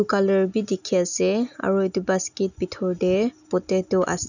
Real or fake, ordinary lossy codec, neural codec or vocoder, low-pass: real; none; none; 7.2 kHz